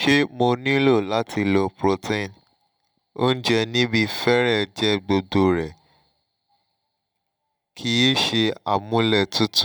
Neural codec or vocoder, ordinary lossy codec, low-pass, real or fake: none; none; none; real